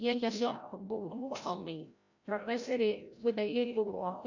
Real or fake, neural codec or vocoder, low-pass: fake; codec, 16 kHz, 0.5 kbps, FreqCodec, larger model; 7.2 kHz